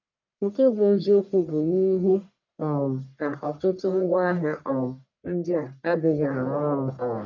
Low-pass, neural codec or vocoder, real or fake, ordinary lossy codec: 7.2 kHz; codec, 44.1 kHz, 1.7 kbps, Pupu-Codec; fake; none